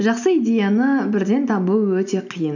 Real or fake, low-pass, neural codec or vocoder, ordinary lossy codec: real; 7.2 kHz; none; none